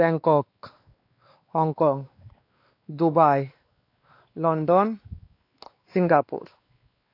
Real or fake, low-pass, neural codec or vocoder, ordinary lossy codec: fake; 5.4 kHz; codec, 16 kHz, 2 kbps, X-Codec, WavLM features, trained on Multilingual LibriSpeech; AAC, 32 kbps